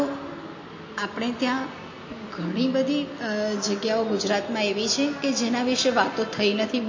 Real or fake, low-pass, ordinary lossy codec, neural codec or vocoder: real; 7.2 kHz; MP3, 32 kbps; none